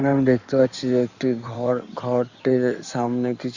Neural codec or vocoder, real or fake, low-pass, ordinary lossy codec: codec, 16 kHz in and 24 kHz out, 2.2 kbps, FireRedTTS-2 codec; fake; 7.2 kHz; Opus, 64 kbps